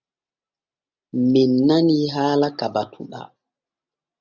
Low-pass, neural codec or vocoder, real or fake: 7.2 kHz; none; real